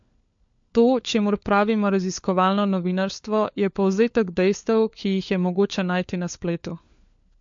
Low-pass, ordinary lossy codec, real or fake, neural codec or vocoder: 7.2 kHz; MP3, 48 kbps; fake; codec, 16 kHz, 4 kbps, FunCodec, trained on LibriTTS, 50 frames a second